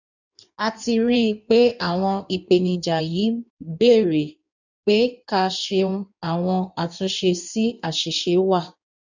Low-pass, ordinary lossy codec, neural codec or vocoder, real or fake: 7.2 kHz; none; codec, 16 kHz in and 24 kHz out, 1.1 kbps, FireRedTTS-2 codec; fake